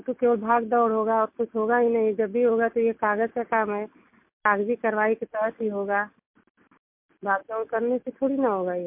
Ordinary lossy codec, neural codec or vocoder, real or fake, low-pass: MP3, 32 kbps; none; real; 3.6 kHz